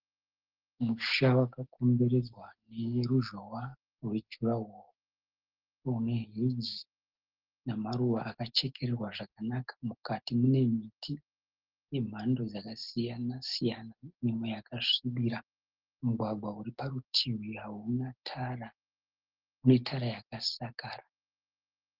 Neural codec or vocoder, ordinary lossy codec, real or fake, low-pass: none; Opus, 16 kbps; real; 5.4 kHz